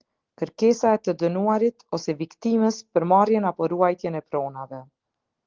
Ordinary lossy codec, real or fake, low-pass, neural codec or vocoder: Opus, 16 kbps; real; 7.2 kHz; none